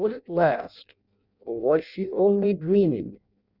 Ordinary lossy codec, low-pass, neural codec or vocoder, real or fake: Opus, 64 kbps; 5.4 kHz; codec, 16 kHz in and 24 kHz out, 0.6 kbps, FireRedTTS-2 codec; fake